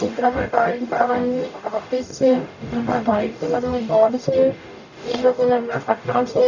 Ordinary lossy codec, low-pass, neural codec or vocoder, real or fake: none; 7.2 kHz; codec, 44.1 kHz, 0.9 kbps, DAC; fake